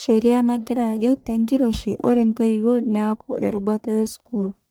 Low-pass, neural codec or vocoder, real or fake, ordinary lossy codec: none; codec, 44.1 kHz, 1.7 kbps, Pupu-Codec; fake; none